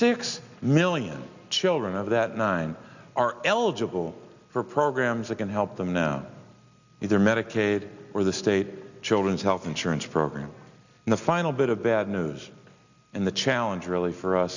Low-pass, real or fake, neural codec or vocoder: 7.2 kHz; real; none